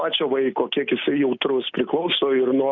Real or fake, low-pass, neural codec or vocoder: real; 7.2 kHz; none